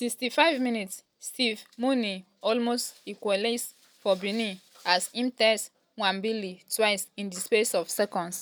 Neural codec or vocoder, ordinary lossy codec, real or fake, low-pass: none; none; real; none